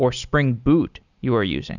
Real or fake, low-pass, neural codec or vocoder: real; 7.2 kHz; none